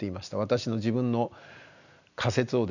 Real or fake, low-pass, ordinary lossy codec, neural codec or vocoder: real; 7.2 kHz; none; none